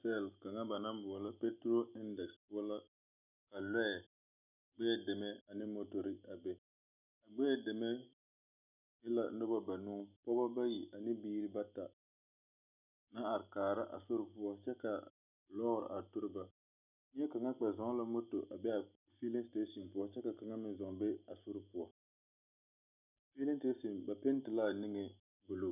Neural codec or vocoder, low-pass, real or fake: none; 3.6 kHz; real